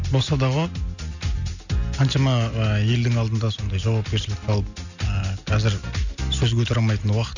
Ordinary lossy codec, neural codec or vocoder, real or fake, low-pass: none; none; real; 7.2 kHz